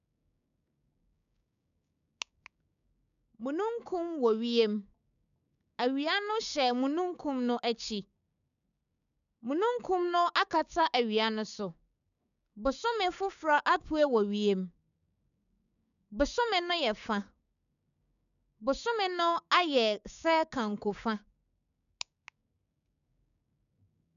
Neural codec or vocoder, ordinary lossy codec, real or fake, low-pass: codec, 16 kHz, 6 kbps, DAC; none; fake; 7.2 kHz